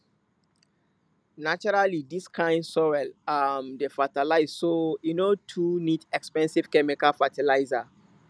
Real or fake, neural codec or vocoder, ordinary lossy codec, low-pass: real; none; none; none